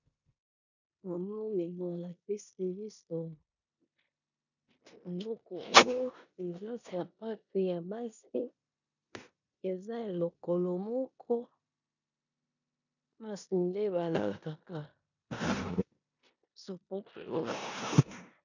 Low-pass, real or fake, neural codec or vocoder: 7.2 kHz; fake; codec, 16 kHz in and 24 kHz out, 0.9 kbps, LongCat-Audio-Codec, four codebook decoder